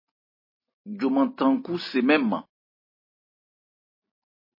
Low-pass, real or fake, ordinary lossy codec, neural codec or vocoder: 5.4 kHz; real; MP3, 24 kbps; none